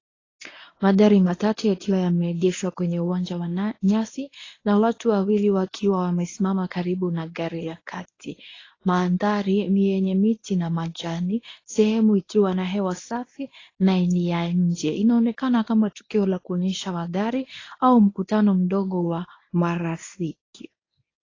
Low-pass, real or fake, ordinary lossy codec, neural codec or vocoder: 7.2 kHz; fake; AAC, 32 kbps; codec, 24 kHz, 0.9 kbps, WavTokenizer, medium speech release version 1